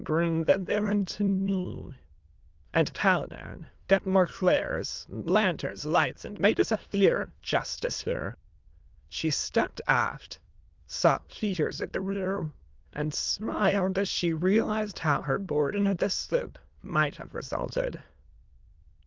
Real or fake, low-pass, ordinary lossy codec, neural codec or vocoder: fake; 7.2 kHz; Opus, 24 kbps; autoencoder, 22.05 kHz, a latent of 192 numbers a frame, VITS, trained on many speakers